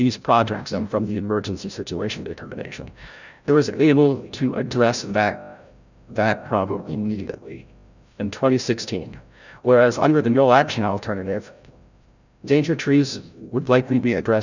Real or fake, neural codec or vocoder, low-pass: fake; codec, 16 kHz, 0.5 kbps, FreqCodec, larger model; 7.2 kHz